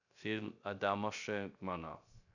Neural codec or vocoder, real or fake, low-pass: codec, 16 kHz, 0.3 kbps, FocalCodec; fake; 7.2 kHz